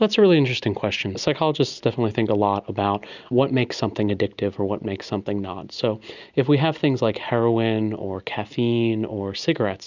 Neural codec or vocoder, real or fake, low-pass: none; real; 7.2 kHz